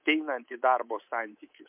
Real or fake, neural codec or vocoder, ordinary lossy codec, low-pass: fake; codec, 24 kHz, 3.1 kbps, DualCodec; MP3, 32 kbps; 3.6 kHz